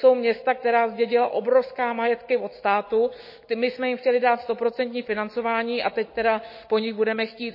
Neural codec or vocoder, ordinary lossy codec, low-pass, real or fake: none; MP3, 24 kbps; 5.4 kHz; real